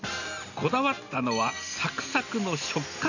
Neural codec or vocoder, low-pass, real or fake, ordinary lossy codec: none; 7.2 kHz; real; none